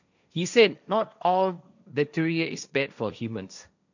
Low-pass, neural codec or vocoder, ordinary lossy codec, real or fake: 7.2 kHz; codec, 16 kHz, 1.1 kbps, Voila-Tokenizer; none; fake